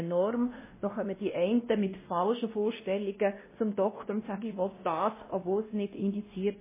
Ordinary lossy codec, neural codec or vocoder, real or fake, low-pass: MP3, 16 kbps; codec, 16 kHz, 1 kbps, X-Codec, WavLM features, trained on Multilingual LibriSpeech; fake; 3.6 kHz